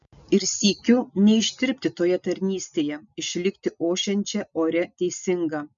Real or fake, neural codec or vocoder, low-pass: real; none; 7.2 kHz